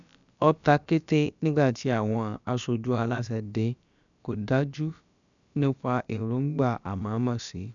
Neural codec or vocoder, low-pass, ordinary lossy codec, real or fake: codec, 16 kHz, about 1 kbps, DyCAST, with the encoder's durations; 7.2 kHz; none; fake